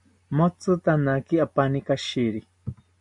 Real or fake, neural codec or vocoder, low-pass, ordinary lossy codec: real; none; 10.8 kHz; MP3, 64 kbps